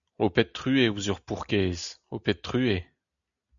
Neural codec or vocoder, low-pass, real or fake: none; 7.2 kHz; real